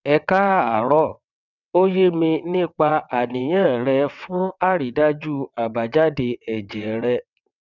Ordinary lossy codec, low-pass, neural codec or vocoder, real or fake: none; 7.2 kHz; vocoder, 22.05 kHz, 80 mel bands, WaveNeXt; fake